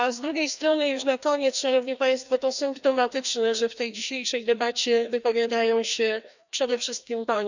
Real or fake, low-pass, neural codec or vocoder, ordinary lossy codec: fake; 7.2 kHz; codec, 16 kHz, 1 kbps, FreqCodec, larger model; none